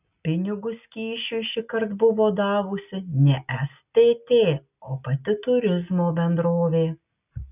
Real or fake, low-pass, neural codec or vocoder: real; 3.6 kHz; none